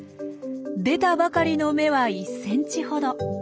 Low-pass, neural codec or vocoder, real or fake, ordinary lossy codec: none; none; real; none